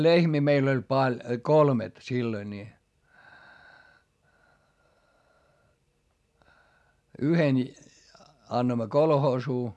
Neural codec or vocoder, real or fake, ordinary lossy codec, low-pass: none; real; none; none